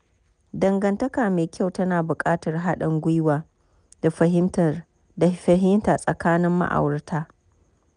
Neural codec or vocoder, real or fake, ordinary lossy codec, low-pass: none; real; none; 9.9 kHz